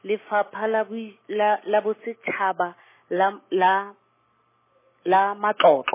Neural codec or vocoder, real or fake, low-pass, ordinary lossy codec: none; real; 3.6 kHz; MP3, 16 kbps